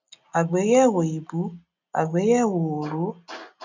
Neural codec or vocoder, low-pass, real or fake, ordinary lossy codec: none; 7.2 kHz; real; none